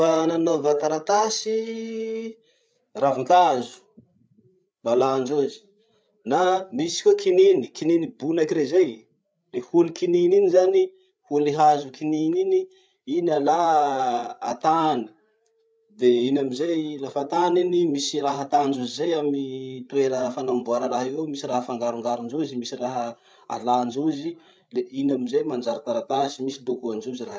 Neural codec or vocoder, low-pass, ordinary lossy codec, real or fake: codec, 16 kHz, 8 kbps, FreqCodec, larger model; none; none; fake